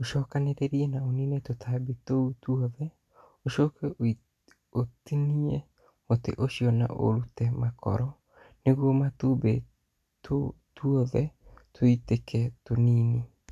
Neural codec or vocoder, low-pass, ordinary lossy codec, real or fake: none; 14.4 kHz; none; real